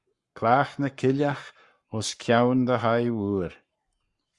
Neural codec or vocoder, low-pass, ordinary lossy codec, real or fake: codec, 44.1 kHz, 7.8 kbps, Pupu-Codec; 10.8 kHz; Opus, 64 kbps; fake